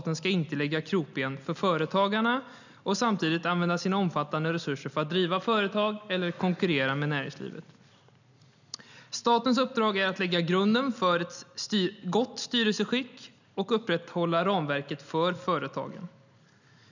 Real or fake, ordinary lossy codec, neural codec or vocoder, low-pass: real; none; none; 7.2 kHz